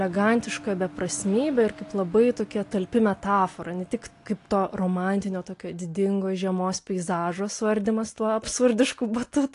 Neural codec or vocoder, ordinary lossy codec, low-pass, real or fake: none; AAC, 48 kbps; 10.8 kHz; real